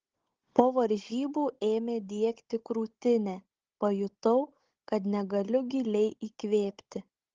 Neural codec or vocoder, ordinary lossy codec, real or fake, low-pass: codec, 16 kHz, 16 kbps, FunCodec, trained on Chinese and English, 50 frames a second; Opus, 16 kbps; fake; 7.2 kHz